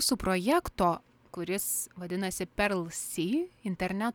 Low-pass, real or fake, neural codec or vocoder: 19.8 kHz; real; none